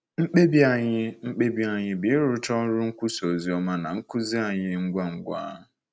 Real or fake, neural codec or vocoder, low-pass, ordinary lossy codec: real; none; none; none